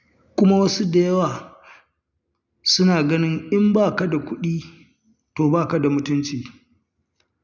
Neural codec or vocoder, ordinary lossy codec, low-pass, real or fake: none; none; 7.2 kHz; real